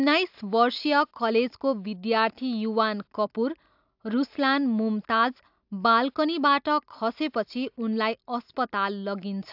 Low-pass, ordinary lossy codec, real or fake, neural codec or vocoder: 5.4 kHz; AAC, 48 kbps; real; none